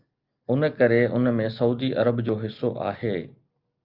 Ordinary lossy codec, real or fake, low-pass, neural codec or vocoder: Opus, 24 kbps; real; 5.4 kHz; none